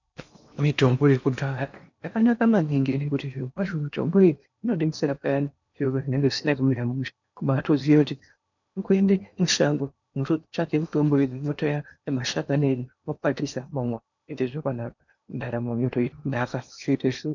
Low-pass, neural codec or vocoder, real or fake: 7.2 kHz; codec, 16 kHz in and 24 kHz out, 0.8 kbps, FocalCodec, streaming, 65536 codes; fake